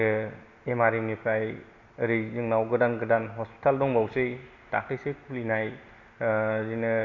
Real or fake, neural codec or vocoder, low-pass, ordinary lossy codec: real; none; 7.2 kHz; none